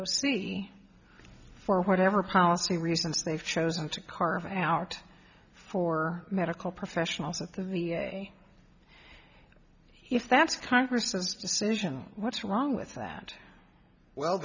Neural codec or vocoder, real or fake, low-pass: none; real; 7.2 kHz